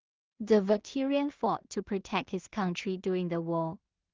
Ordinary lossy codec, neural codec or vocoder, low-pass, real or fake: Opus, 16 kbps; codec, 16 kHz in and 24 kHz out, 0.4 kbps, LongCat-Audio-Codec, two codebook decoder; 7.2 kHz; fake